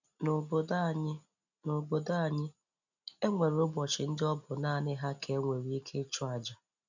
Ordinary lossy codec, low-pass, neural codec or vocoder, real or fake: none; 7.2 kHz; none; real